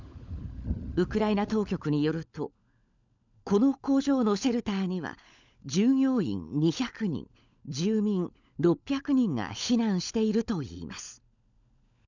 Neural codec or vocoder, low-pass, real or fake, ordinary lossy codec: codec, 16 kHz, 16 kbps, FunCodec, trained on LibriTTS, 50 frames a second; 7.2 kHz; fake; none